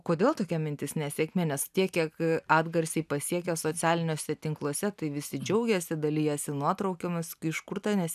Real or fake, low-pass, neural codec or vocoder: real; 14.4 kHz; none